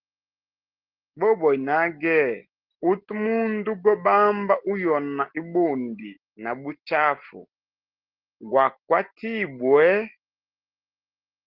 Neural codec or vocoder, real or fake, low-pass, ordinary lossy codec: none; real; 5.4 kHz; Opus, 16 kbps